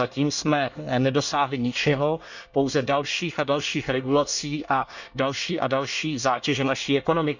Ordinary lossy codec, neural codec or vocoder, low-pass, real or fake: none; codec, 24 kHz, 1 kbps, SNAC; 7.2 kHz; fake